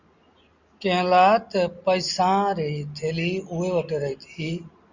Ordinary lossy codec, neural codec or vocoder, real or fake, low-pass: Opus, 64 kbps; none; real; 7.2 kHz